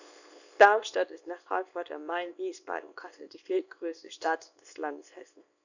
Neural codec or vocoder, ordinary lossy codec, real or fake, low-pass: codec, 24 kHz, 0.9 kbps, WavTokenizer, small release; none; fake; 7.2 kHz